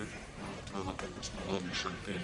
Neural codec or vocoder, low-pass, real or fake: codec, 44.1 kHz, 1.7 kbps, Pupu-Codec; 10.8 kHz; fake